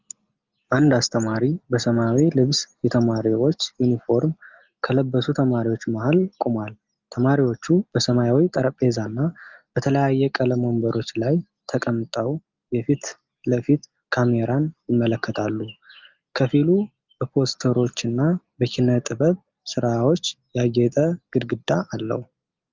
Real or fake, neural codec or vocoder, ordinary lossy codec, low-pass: real; none; Opus, 32 kbps; 7.2 kHz